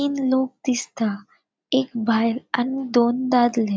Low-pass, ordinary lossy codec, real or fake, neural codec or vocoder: none; none; real; none